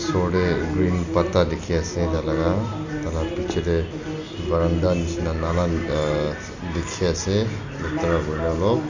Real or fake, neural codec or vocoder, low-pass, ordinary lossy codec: real; none; 7.2 kHz; Opus, 64 kbps